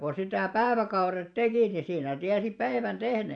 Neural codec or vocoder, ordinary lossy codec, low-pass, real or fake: vocoder, 44.1 kHz, 128 mel bands every 512 samples, BigVGAN v2; none; 9.9 kHz; fake